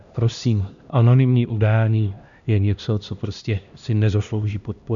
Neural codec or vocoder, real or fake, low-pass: codec, 16 kHz, 1 kbps, X-Codec, HuBERT features, trained on LibriSpeech; fake; 7.2 kHz